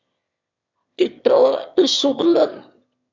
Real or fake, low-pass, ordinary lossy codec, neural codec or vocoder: fake; 7.2 kHz; MP3, 64 kbps; autoencoder, 22.05 kHz, a latent of 192 numbers a frame, VITS, trained on one speaker